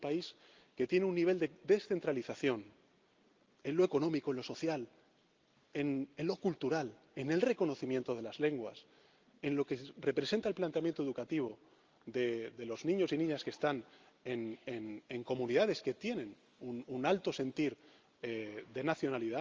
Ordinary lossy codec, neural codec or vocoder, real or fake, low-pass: Opus, 32 kbps; none; real; 7.2 kHz